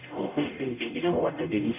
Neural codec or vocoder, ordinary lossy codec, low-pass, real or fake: codec, 44.1 kHz, 0.9 kbps, DAC; none; 3.6 kHz; fake